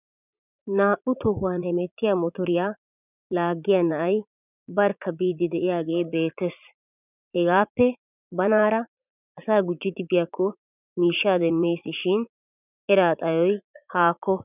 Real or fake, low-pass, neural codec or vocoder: fake; 3.6 kHz; vocoder, 44.1 kHz, 80 mel bands, Vocos